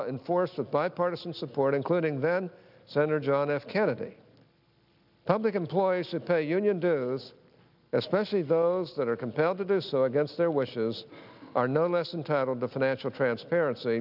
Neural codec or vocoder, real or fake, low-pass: none; real; 5.4 kHz